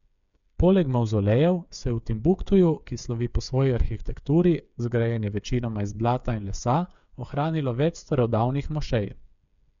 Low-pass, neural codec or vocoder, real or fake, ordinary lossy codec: 7.2 kHz; codec, 16 kHz, 8 kbps, FreqCodec, smaller model; fake; none